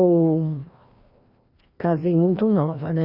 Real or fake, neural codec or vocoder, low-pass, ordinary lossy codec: fake; codec, 16 kHz, 1 kbps, FreqCodec, larger model; 5.4 kHz; Opus, 64 kbps